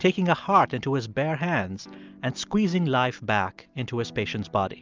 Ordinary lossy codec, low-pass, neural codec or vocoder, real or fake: Opus, 24 kbps; 7.2 kHz; none; real